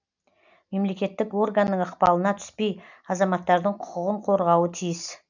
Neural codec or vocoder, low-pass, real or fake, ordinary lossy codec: none; 7.2 kHz; real; none